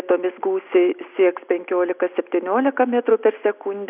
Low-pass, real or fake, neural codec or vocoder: 3.6 kHz; real; none